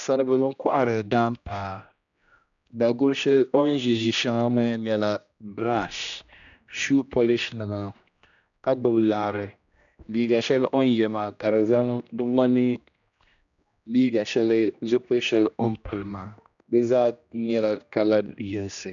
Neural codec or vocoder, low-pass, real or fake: codec, 16 kHz, 1 kbps, X-Codec, HuBERT features, trained on general audio; 7.2 kHz; fake